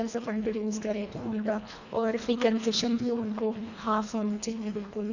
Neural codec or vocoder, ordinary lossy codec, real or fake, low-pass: codec, 24 kHz, 1.5 kbps, HILCodec; none; fake; 7.2 kHz